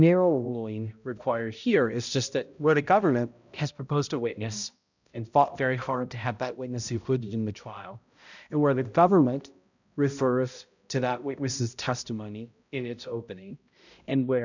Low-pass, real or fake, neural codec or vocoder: 7.2 kHz; fake; codec, 16 kHz, 0.5 kbps, X-Codec, HuBERT features, trained on balanced general audio